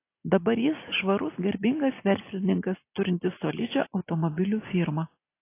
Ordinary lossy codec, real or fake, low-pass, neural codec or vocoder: AAC, 24 kbps; real; 3.6 kHz; none